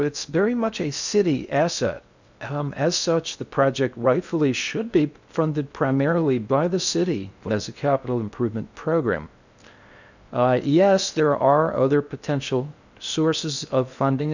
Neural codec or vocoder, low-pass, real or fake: codec, 16 kHz in and 24 kHz out, 0.6 kbps, FocalCodec, streaming, 2048 codes; 7.2 kHz; fake